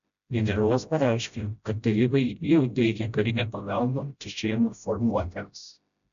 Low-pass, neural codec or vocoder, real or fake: 7.2 kHz; codec, 16 kHz, 0.5 kbps, FreqCodec, smaller model; fake